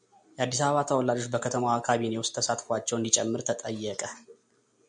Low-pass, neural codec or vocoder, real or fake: 9.9 kHz; none; real